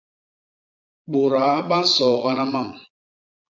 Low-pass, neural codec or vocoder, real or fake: 7.2 kHz; vocoder, 22.05 kHz, 80 mel bands, Vocos; fake